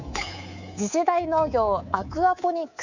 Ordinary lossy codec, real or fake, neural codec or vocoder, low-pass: none; fake; codec, 24 kHz, 3.1 kbps, DualCodec; 7.2 kHz